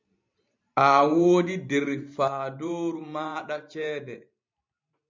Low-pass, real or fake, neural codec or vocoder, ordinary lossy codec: 7.2 kHz; fake; vocoder, 44.1 kHz, 128 mel bands every 512 samples, BigVGAN v2; MP3, 64 kbps